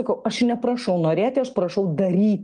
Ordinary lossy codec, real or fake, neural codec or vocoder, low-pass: Opus, 32 kbps; real; none; 9.9 kHz